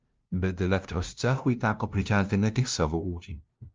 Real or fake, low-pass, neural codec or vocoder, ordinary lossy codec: fake; 7.2 kHz; codec, 16 kHz, 0.5 kbps, FunCodec, trained on LibriTTS, 25 frames a second; Opus, 24 kbps